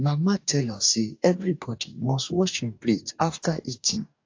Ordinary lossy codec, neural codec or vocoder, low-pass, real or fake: AAC, 48 kbps; codec, 44.1 kHz, 2.6 kbps, DAC; 7.2 kHz; fake